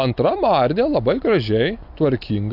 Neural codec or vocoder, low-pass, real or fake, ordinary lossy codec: none; 5.4 kHz; real; AAC, 48 kbps